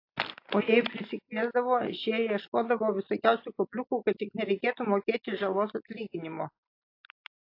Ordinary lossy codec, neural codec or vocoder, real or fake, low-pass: AAC, 32 kbps; none; real; 5.4 kHz